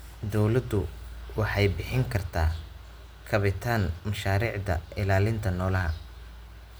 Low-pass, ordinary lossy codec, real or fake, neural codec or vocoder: none; none; real; none